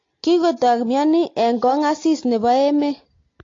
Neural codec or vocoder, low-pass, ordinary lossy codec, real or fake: none; 7.2 kHz; AAC, 32 kbps; real